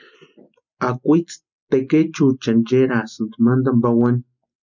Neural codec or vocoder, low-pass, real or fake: none; 7.2 kHz; real